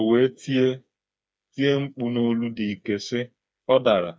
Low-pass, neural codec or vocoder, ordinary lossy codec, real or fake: none; codec, 16 kHz, 4 kbps, FreqCodec, smaller model; none; fake